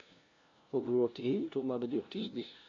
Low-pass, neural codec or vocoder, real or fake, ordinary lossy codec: 7.2 kHz; codec, 16 kHz, 0.5 kbps, FunCodec, trained on LibriTTS, 25 frames a second; fake; AAC, 32 kbps